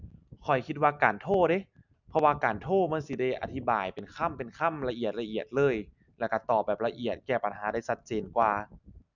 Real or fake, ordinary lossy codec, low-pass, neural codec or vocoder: real; none; 7.2 kHz; none